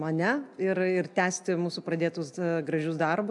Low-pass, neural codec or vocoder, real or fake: 10.8 kHz; none; real